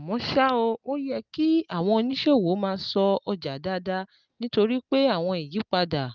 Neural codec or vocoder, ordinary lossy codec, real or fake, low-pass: none; Opus, 24 kbps; real; 7.2 kHz